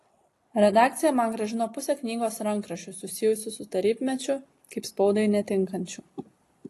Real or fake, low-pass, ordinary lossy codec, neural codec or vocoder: real; 14.4 kHz; AAC, 48 kbps; none